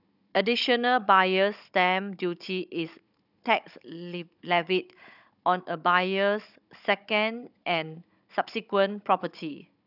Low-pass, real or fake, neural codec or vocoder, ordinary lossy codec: 5.4 kHz; fake; codec, 16 kHz, 16 kbps, FunCodec, trained on Chinese and English, 50 frames a second; none